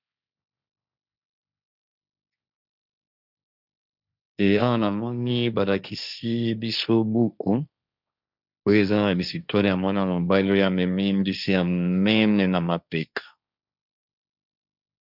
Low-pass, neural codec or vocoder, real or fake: 5.4 kHz; codec, 16 kHz, 1.1 kbps, Voila-Tokenizer; fake